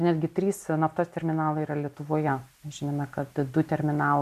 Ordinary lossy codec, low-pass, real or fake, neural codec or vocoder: MP3, 96 kbps; 14.4 kHz; real; none